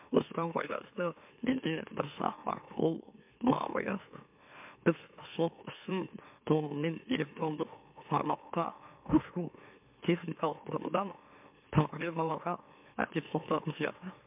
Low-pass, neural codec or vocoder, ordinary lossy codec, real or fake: 3.6 kHz; autoencoder, 44.1 kHz, a latent of 192 numbers a frame, MeloTTS; MP3, 32 kbps; fake